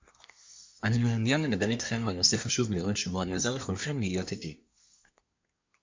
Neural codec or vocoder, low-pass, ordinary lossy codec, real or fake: codec, 24 kHz, 1 kbps, SNAC; 7.2 kHz; MP3, 64 kbps; fake